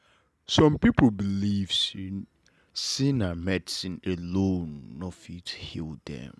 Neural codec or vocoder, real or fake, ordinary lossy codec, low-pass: none; real; none; none